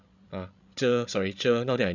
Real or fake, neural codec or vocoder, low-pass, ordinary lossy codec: fake; codec, 16 kHz, 16 kbps, FreqCodec, larger model; 7.2 kHz; none